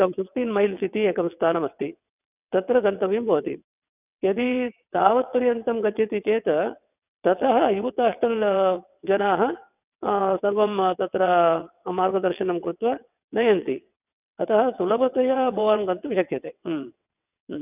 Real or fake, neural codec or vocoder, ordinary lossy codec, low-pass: fake; vocoder, 22.05 kHz, 80 mel bands, WaveNeXt; none; 3.6 kHz